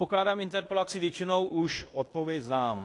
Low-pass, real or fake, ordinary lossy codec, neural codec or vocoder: 10.8 kHz; fake; AAC, 48 kbps; codec, 16 kHz in and 24 kHz out, 0.9 kbps, LongCat-Audio-Codec, fine tuned four codebook decoder